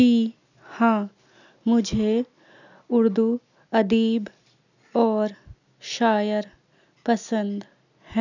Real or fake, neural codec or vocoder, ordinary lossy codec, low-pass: real; none; none; 7.2 kHz